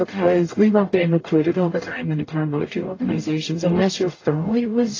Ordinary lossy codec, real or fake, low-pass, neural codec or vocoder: AAC, 32 kbps; fake; 7.2 kHz; codec, 44.1 kHz, 0.9 kbps, DAC